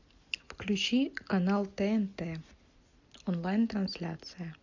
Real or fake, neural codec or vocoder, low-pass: real; none; 7.2 kHz